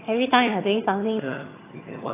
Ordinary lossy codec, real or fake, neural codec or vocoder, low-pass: AAC, 16 kbps; fake; vocoder, 22.05 kHz, 80 mel bands, HiFi-GAN; 3.6 kHz